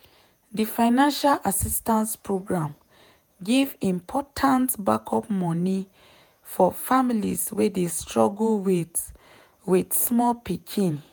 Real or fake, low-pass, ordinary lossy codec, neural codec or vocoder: fake; none; none; vocoder, 48 kHz, 128 mel bands, Vocos